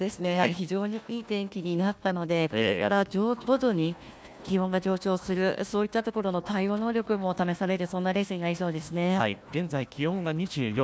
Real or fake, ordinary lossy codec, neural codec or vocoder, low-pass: fake; none; codec, 16 kHz, 1 kbps, FunCodec, trained on Chinese and English, 50 frames a second; none